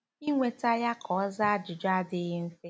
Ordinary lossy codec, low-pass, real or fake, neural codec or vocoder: none; none; real; none